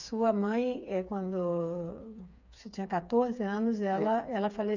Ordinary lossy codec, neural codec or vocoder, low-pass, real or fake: none; codec, 16 kHz, 4 kbps, FreqCodec, smaller model; 7.2 kHz; fake